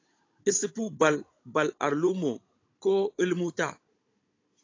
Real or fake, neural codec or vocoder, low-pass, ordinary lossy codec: fake; codec, 16 kHz, 16 kbps, FunCodec, trained on Chinese and English, 50 frames a second; 7.2 kHz; AAC, 32 kbps